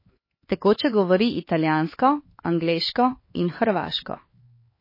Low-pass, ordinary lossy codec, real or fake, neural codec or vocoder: 5.4 kHz; MP3, 24 kbps; fake; codec, 16 kHz, 4 kbps, X-Codec, HuBERT features, trained on LibriSpeech